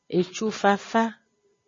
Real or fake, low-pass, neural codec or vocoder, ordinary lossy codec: real; 7.2 kHz; none; MP3, 32 kbps